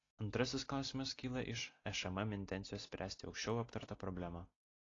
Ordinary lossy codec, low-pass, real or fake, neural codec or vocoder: AAC, 32 kbps; 7.2 kHz; real; none